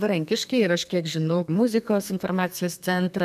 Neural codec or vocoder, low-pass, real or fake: codec, 32 kHz, 1.9 kbps, SNAC; 14.4 kHz; fake